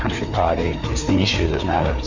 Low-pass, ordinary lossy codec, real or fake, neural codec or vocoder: 7.2 kHz; Opus, 64 kbps; fake; codec, 16 kHz, 4 kbps, FreqCodec, larger model